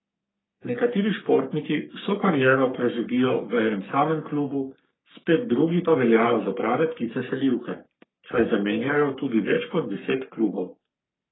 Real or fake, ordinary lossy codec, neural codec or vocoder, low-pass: fake; AAC, 16 kbps; codec, 44.1 kHz, 3.4 kbps, Pupu-Codec; 7.2 kHz